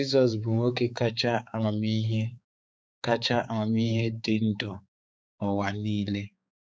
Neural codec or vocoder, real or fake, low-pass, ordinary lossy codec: codec, 16 kHz, 4 kbps, X-Codec, HuBERT features, trained on balanced general audio; fake; none; none